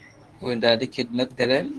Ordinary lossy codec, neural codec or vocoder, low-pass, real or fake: Opus, 16 kbps; codec, 24 kHz, 0.9 kbps, WavTokenizer, medium speech release version 2; 10.8 kHz; fake